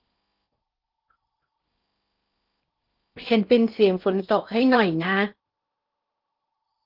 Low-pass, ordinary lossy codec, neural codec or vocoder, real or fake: 5.4 kHz; Opus, 24 kbps; codec, 16 kHz in and 24 kHz out, 0.6 kbps, FocalCodec, streaming, 4096 codes; fake